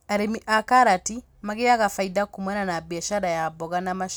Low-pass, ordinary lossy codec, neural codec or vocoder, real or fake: none; none; none; real